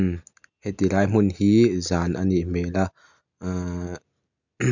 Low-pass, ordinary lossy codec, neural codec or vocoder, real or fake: 7.2 kHz; none; none; real